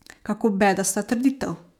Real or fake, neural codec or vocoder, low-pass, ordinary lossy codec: fake; autoencoder, 48 kHz, 128 numbers a frame, DAC-VAE, trained on Japanese speech; 19.8 kHz; none